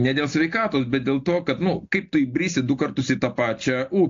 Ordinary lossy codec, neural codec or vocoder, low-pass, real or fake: AAC, 48 kbps; none; 7.2 kHz; real